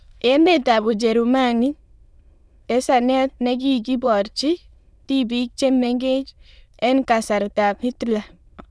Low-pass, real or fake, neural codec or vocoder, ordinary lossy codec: none; fake; autoencoder, 22.05 kHz, a latent of 192 numbers a frame, VITS, trained on many speakers; none